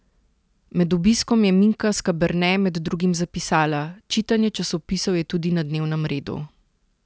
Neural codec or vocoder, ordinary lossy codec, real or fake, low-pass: none; none; real; none